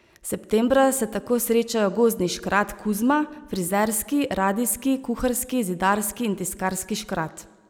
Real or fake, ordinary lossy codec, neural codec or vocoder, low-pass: real; none; none; none